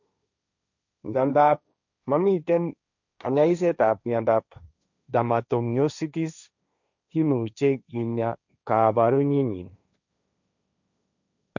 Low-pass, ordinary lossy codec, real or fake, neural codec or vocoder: 7.2 kHz; none; fake; codec, 16 kHz, 1.1 kbps, Voila-Tokenizer